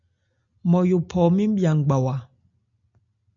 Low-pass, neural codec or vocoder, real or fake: 7.2 kHz; none; real